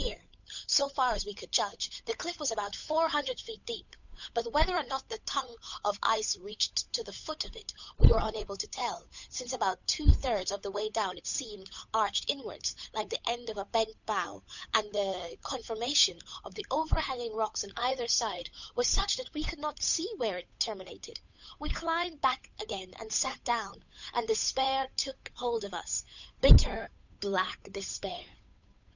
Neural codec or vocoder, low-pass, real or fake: codec, 16 kHz, 8 kbps, FunCodec, trained on Chinese and English, 25 frames a second; 7.2 kHz; fake